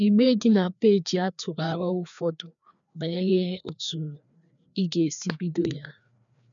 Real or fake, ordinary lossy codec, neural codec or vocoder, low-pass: fake; none; codec, 16 kHz, 2 kbps, FreqCodec, larger model; 7.2 kHz